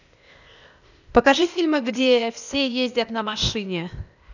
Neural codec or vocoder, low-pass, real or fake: codec, 16 kHz, 0.8 kbps, ZipCodec; 7.2 kHz; fake